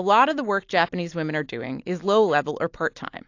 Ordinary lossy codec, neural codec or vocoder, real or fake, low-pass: AAC, 48 kbps; none; real; 7.2 kHz